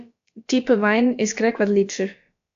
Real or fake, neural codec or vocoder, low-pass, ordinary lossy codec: fake; codec, 16 kHz, about 1 kbps, DyCAST, with the encoder's durations; 7.2 kHz; AAC, 64 kbps